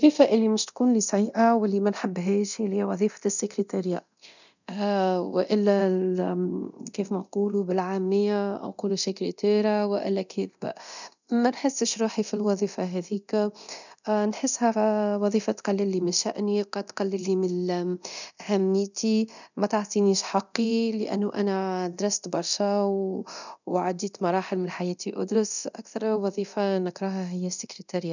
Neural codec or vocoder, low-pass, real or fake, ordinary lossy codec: codec, 24 kHz, 0.9 kbps, DualCodec; 7.2 kHz; fake; none